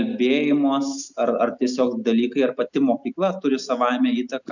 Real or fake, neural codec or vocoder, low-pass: real; none; 7.2 kHz